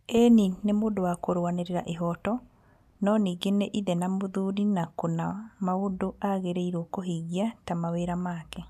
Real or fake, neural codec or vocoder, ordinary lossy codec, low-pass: real; none; none; 14.4 kHz